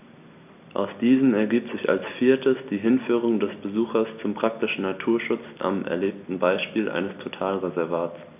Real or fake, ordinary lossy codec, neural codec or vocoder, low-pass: real; none; none; 3.6 kHz